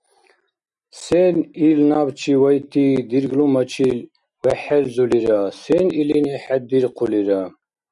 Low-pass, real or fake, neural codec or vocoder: 10.8 kHz; real; none